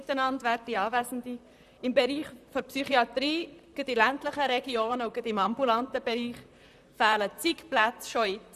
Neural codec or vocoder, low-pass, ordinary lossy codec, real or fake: vocoder, 44.1 kHz, 128 mel bands, Pupu-Vocoder; 14.4 kHz; none; fake